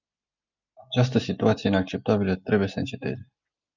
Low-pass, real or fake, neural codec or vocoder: 7.2 kHz; real; none